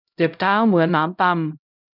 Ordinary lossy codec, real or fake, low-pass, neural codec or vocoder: none; fake; 5.4 kHz; codec, 16 kHz, 0.5 kbps, X-Codec, HuBERT features, trained on LibriSpeech